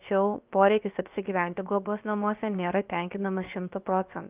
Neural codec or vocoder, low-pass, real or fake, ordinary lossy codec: codec, 16 kHz, about 1 kbps, DyCAST, with the encoder's durations; 3.6 kHz; fake; Opus, 24 kbps